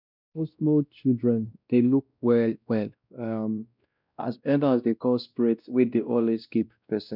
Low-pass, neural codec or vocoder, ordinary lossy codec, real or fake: 5.4 kHz; codec, 16 kHz, 1 kbps, X-Codec, WavLM features, trained on Multilingual LibriSpeech; none; fake